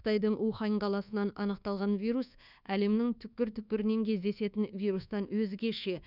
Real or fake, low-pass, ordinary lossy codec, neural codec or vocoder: fake; 5.4 kHz; none; codec, 24 kHz, 1.2 kbps, DualCodec